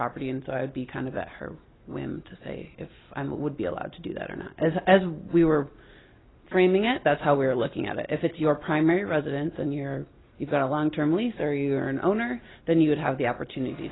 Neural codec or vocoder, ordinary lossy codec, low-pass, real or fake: none; AAC, 16 kbps; 7.2 kHz; real